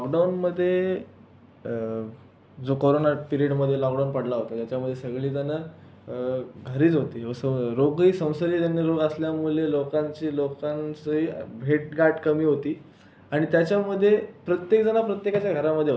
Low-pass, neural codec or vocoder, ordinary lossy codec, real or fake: none; none; none; real